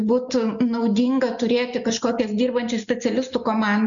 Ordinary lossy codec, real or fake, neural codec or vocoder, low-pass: MP3, 96 kbps; real; none; 7.2 kHz